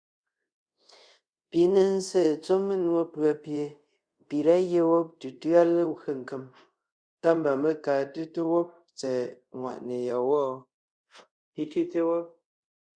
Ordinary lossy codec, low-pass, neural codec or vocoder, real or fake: Opus, 64 kbps; 9.9 kHz; codec, 24 kHz, 0.5 kbps, DualCodec; fake